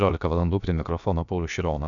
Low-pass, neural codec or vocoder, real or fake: 7.2 kHz; codec, 16 kHz, about 1 kbps, DyCAST, with the encoder's durations; fake